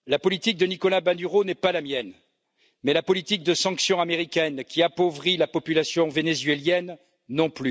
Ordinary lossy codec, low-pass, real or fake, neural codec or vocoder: none; none; real; none